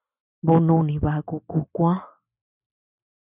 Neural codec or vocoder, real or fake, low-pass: none; real; 3.6 kHz